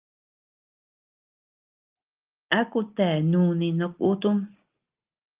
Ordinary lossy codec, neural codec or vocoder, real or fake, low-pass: Opus, 32 kbps; none; real; 3.6 kHz